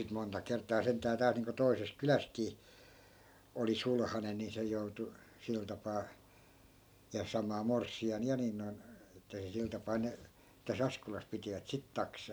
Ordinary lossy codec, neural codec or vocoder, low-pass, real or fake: none; none; none; real